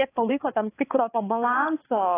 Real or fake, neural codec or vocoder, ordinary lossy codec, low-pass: fake; codec, 16 kHz, 4 kbps, X-Codec, HuBERT features, trained on balanced general audio; AAC, 16 kbps; 3.6 kHz